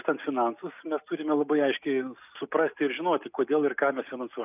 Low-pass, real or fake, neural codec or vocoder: 3.6 kHz; real; none